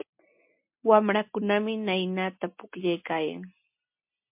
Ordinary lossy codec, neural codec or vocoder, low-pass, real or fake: MP3, 32 kbps; none; 3.6 kHz; real